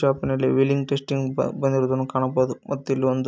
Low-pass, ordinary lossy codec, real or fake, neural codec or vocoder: none; none; real; none